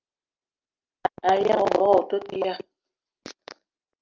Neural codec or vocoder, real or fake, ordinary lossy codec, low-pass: none; real; Opus, 24 kbps; 7.2 kHz